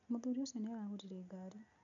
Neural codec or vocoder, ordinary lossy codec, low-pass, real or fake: none; none; 7.2 kHz; real